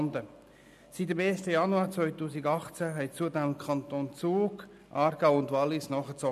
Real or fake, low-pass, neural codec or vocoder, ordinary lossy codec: real; 14.4 kHz; none; none